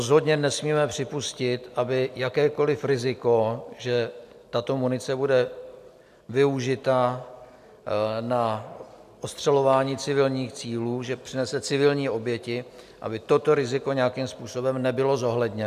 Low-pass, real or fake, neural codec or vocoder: 14.4 kHz; real; none